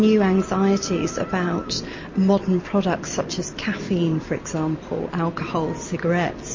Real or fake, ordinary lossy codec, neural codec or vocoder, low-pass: real; MP3, 32 kbps; none; 7.2 kHz